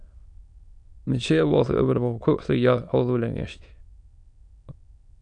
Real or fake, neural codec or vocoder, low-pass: fake; autoencoder, 22.05 kHz, a latent of 192 numbers a frame, VITS, trained on many speakers; 9.9 kHz